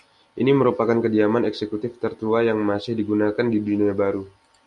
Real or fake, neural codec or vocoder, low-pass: real; none; 10.8 kHz